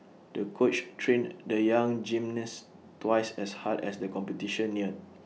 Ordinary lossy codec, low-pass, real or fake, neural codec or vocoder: none; none; real; none